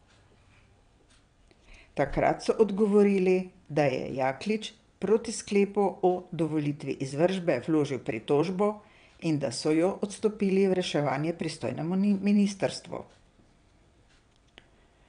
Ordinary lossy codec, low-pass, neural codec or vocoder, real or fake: none; 9.9 kHz; vocoder, 22.05 kHz, 80 mel bands, WaveNeXt; fake